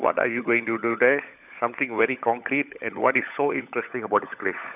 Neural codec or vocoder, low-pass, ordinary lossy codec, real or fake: codec, 16 kHz, 16 kbps, FunCodec, trained on LibriTTS, 50 frames a second; 3.6 kHz; none; fake